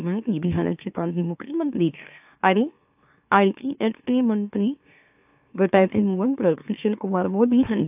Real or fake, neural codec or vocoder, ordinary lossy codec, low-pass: fake; autoencoder, 44.1 kHz, a latent of 192 numbers a frame, MeloTTS; AAC, 32 kbps; 3.6 kHz